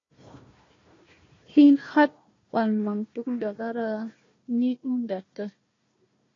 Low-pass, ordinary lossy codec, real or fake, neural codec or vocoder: 7.2 kHz; AAC, 32 kbps; fake; codec, 16 kHz, 1 kbps, FunCodec, trained on Chinese and English, 50 frames a second